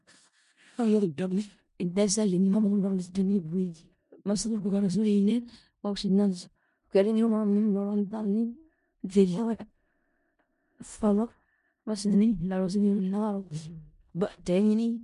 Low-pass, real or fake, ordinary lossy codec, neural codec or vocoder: 10.8 kHz; fake; MP3, 64 kbps; codec, 16 kHz in and 24 kHz out, 0.4 kbps, LongCat-Audio-Codec, four codebook decoder